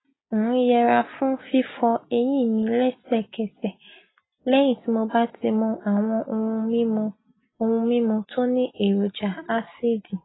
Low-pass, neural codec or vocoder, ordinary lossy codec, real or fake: 7.2 kHz; none; AAC, 16 kbps; real